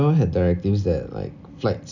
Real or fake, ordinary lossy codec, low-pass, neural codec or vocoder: real; none; 7.2 kHz; none